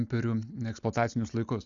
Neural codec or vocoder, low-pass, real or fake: none; 7.2 kHz; real